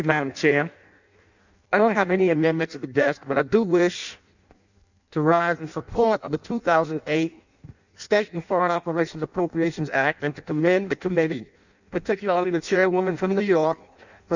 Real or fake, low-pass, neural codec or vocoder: fake; 7.2 kHz; codec, 16 kHz in and 24 kHz out, 0.6 kbps, FireRedTTS-2 codec